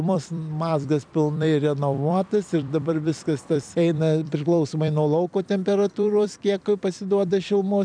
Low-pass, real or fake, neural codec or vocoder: 9.9 kHz; fake; vocoder, 44.1 kHz, 128 mel bands every 256 samples, BigVGAN v2